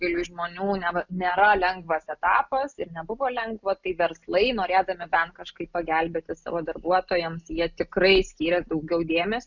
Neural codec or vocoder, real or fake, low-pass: none; real; 7.2 kHz